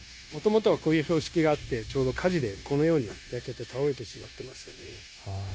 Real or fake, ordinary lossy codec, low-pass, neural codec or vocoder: fake; none; none; codec, 16 kHz, 0.9 kbps, LongCat-Audio-Codec